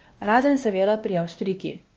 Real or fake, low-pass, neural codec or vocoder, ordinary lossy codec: fake; 7.2 kHz; codec, 16 kHz, 1 kbps, X-Codec, WavLM features, trained on Multilingual LibriSpeech; Opus, 24 kbps